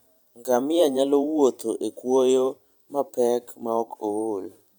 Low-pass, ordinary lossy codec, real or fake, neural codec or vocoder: none; none; fake; vocoder, 44.1 kHz, 128 mel bands every 512 samples, BigVGAN v2